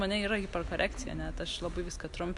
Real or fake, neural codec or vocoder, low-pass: real; none; 10.8 kHz